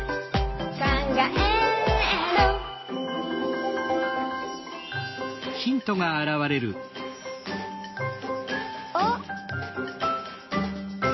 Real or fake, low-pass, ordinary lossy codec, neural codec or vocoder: real; 7.2 kHz; MP3, 24 kbps; none